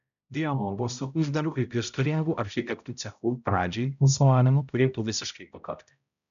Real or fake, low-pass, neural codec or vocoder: fake; 7.2 kHz; codec, 16 kHz, 0.5 kbps, X-Codec, HuBERT features, trained on balanced general audio